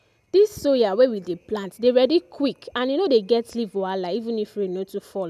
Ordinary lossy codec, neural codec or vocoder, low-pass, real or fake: none; none; 14.4 kHz; real